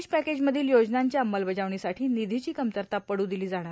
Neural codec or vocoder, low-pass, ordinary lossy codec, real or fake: none; none; none; real